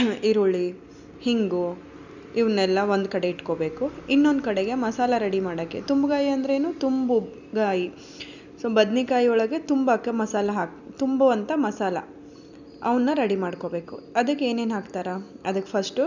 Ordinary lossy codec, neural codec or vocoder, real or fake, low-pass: none; none; real; 7.2 kHz